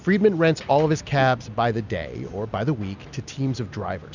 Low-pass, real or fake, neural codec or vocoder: 7.2 kHz; real; none